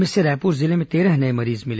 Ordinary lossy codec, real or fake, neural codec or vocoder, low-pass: none; real; none; none